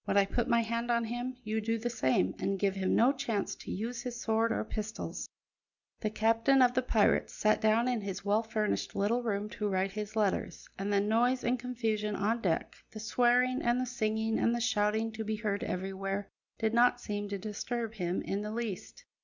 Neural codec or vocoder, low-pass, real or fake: none; 7.2 kHz; real